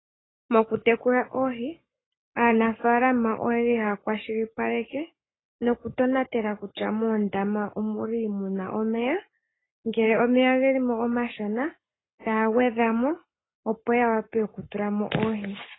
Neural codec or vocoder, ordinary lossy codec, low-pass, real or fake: codec, 44.1 kHz, 7.8 kbps, Pupu-Codec; AAC, 16 kbps; 7.2 kHz; fake